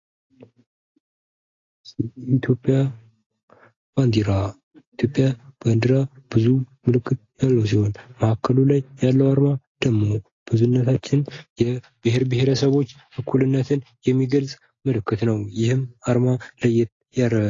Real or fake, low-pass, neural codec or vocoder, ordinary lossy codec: real; 7.2 kHz; none; AAC, 32 kbps